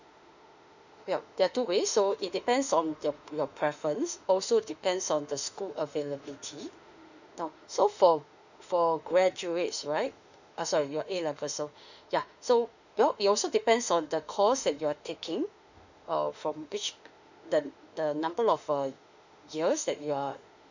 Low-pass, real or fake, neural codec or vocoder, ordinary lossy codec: 7.2 kHz; fake; autoencoder, 48 kHz, 32 numbers a frame, DAC-VAE, trained on Japanese speech; none